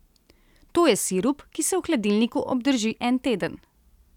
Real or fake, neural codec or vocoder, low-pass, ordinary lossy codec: real; none; 19.8 kHz; none